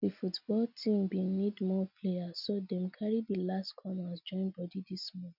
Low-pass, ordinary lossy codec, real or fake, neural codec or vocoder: 5.4 kHz; none; real; none